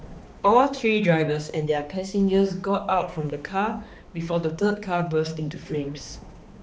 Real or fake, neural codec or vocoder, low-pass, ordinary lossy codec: fake; codec, 16 kHz, 2 kbps, X-Codec, HuBERT features, trained on balanced general audio; none; none